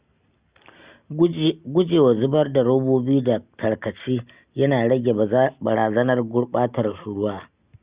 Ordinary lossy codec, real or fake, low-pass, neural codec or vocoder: Opus, 64 kbps; real; 3.6 kHz; none